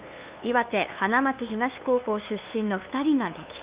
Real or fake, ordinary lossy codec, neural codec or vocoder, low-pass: fake; Opus, 64 kbps; codec, 16 kHz, 2 kbps, FunCodec, trained on LibriTTS, 25 frames a second; 3.6 kHz